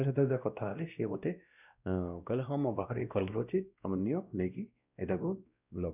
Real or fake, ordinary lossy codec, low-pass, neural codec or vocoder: fake; none; 3.6 kHz; codec, 16 kHz, 1 kbps, X-Codec, WavLM features, trained on Multilingual LibriSpeech